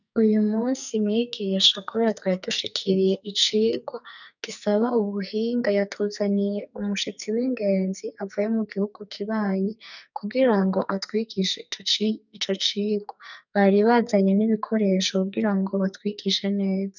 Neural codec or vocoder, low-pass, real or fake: codec, 44.1 kHz, 2.6 kbps, SNAC; 7.2 kHz; fake